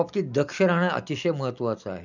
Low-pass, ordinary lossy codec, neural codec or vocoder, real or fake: 7.2 kHz; none; none; real